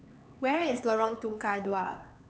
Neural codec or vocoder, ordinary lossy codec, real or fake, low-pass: codec, 16 kHz, 4 kbps, X-Codec, HuBERT features, trained on LibriSpeech; none; fake; none